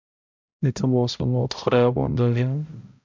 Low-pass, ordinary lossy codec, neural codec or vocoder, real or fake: 7.2 kHz; MP3, 64 kbps; codec, 16 kHz, 0.5 kbps, X-Codec, HuBERT features, trained on balanced general audio; fake